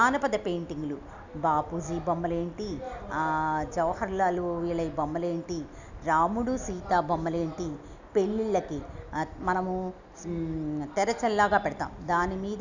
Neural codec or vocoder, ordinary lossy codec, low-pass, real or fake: none; none; 7.2 kHz; real